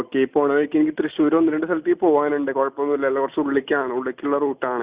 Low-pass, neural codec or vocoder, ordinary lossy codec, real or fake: 3.6 kHz; none; Opus, 64 kbps; real